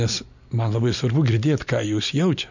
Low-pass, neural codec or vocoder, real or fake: 7.2 kHz; none; real